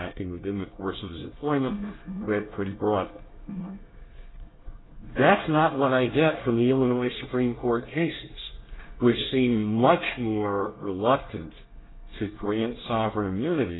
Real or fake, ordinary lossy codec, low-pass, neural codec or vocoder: fake; AAC, 16 kbps; 7.2 kHz; codec, 24 kHz, 1 kbps, SNAC